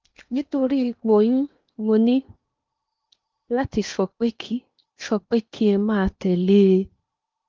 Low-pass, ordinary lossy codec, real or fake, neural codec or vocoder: 7.2 kHz; Opus, 32 kbps; fake; codec, 16 kHz in and 24 kHz out, 0.8 kbps, FocalCodec, streaming, 65536 codes